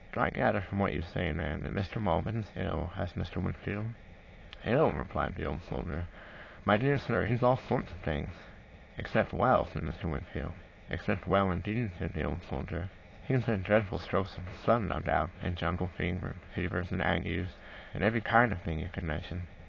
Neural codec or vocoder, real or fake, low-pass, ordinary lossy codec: autoencoder, 22.05 kHz, a latent of 192 numbers a frame, VITS, trained on many speakers; fake; 7.2 kHz; AAC, 32 kbps